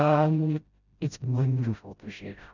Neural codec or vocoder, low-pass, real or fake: codec, 16 kHz, 0.5 kbps, FreqCodec, smaller model; 7.2 kHz; fake